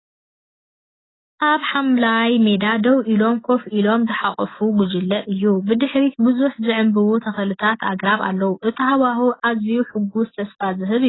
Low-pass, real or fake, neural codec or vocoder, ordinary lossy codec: 7.2 kHz; real; none; AAC, 16 kbps